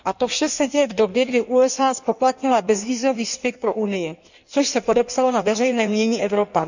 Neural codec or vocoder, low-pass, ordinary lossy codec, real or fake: codec, 16 kHz in and 24 kHz out, 1.1 kbps, FireRedTTS-2 codec; 7.2 kHz; none; fake